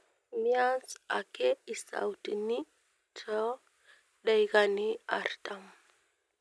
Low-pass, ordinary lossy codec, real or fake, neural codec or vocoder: none; none; real; none